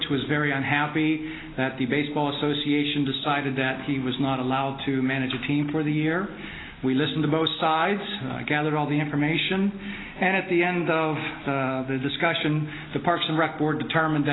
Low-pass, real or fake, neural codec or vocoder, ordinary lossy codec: 7.2 kHz; real; none; AAC, 16 kbps